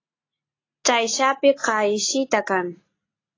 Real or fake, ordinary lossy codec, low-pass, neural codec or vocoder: real; AAC, 32 kbps; 7.2 kHz; none